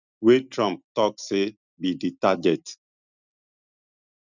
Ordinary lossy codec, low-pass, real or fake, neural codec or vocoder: none; 7.2 kHz; real; none